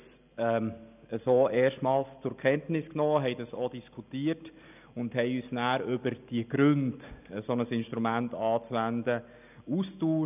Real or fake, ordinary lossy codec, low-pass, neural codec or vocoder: real; none; 3.6 kHz; none